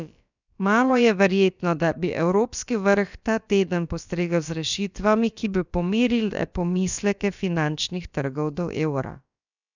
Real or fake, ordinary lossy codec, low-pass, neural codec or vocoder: fake; none; 7.2 kHz; codec, 16 kHz, about 1 kbps, DyCAST, with the encoder's durations